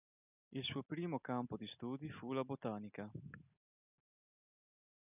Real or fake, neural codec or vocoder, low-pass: real; none; 3.6 kHz